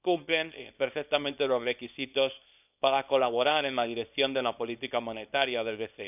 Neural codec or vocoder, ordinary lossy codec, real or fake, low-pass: codec, 24 kHz, 0.9 kbps, WavTokenizer, small release; none; fake; 3.6 kHz